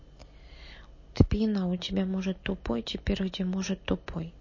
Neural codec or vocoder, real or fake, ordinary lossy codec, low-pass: none; real; MP3, 32 kbps; 7.2 kHz